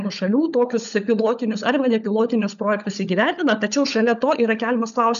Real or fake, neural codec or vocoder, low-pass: fake; codec, 16 kHz, 16 kbps, FunCodec, trained on LibriTTS, 50 frames a second; 7.2 kHz